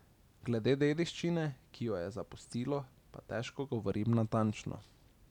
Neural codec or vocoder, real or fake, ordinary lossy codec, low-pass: none; real; none; 19.8 kHz